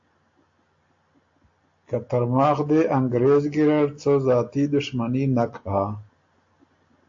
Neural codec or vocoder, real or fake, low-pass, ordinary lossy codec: none; real; 7.2 kHz; AAC, 48 kbps